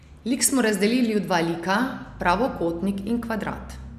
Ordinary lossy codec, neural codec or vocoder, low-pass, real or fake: none; none; 14.4 kHz; real